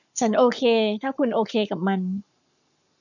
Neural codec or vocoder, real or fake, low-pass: codec, 44.1 kHz, 7.8 kbps, Pupu-Codec; fake; 7.2 kHz